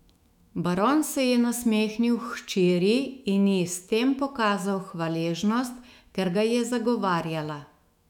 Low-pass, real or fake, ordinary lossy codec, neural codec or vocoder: 19.8 kHz; fake; none; autoencoder, 48 kHz, 128 numbers a frame, DAC-VAE, trained on Japanese speech